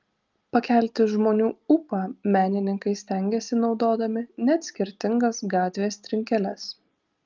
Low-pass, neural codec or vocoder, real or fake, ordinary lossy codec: 7.2 kHz; none; real; Opus, 24 kbps